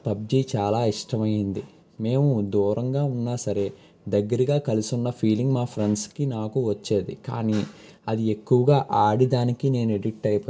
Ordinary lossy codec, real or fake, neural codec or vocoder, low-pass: none; real; none; none